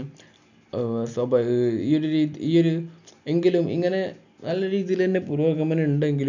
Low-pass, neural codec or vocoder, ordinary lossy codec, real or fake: 7.2 kHz; none; none; real